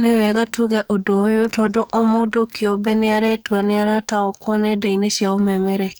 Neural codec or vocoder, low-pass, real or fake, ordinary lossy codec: codec, 44.1 kHz, 2.6 kbps, SNAC; none; fake; none